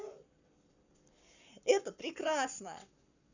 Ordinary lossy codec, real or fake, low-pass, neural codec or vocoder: none; fake; 7.2 kHz; codec, 44.1 kHz, 7.8 kbps, Pupu-Codec